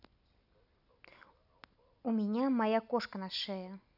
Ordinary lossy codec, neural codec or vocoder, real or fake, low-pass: none; none; real; 5.4 kHz